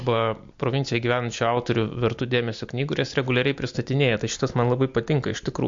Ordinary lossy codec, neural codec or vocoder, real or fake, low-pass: MP3, 48 kbps; none; real; 7.2 kHz